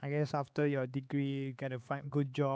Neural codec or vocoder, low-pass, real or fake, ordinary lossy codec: codec, 16 kHz, 4 kbps, X-Codec, HuBERT features, trained on LibriSpeech; none; fake; none